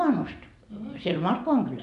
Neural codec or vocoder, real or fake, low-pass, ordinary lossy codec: none; real; 10.8 kHz; none